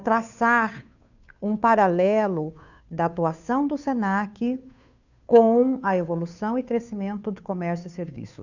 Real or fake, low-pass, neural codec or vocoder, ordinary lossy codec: fake; 7.2 kHz; codec, 16 kHz, 2 kbps, FunCodec, trained on Chinese and English, 25 frames a second; none